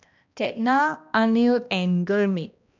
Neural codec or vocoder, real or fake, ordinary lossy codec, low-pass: codec, 16 kHz, 1 kbps, X-Codec, HuBERT features, trained on balanced general audio; fake; none; 7.2 kHz